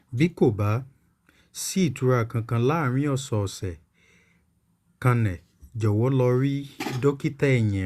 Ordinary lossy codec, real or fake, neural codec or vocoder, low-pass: Opus, 64 kbps; real; none; 14.4 kHz